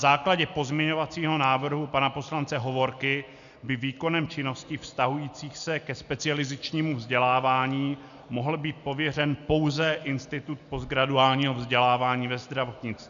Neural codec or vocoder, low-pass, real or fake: none; 7.2 kHz; real